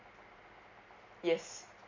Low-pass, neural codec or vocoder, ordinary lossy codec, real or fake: 7.2 kHz; none; MP3, 64 kbps; real